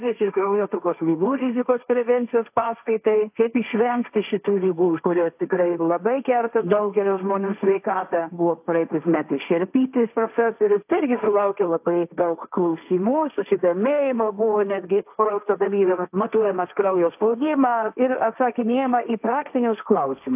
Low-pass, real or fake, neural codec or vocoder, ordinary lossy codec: 3.6 kHz; fake; codec, 16 kHz, 1.1 kbps, Voila-Tokenizer; AAC, 32 kbps